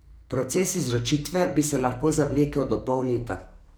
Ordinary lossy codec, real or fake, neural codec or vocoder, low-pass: none; fake; codec, 44.1 kHz, 2.6 kbps, SNAC; none